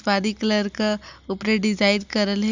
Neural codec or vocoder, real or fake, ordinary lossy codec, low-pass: none; real; Opus, 64 kbps; 7.2 kHz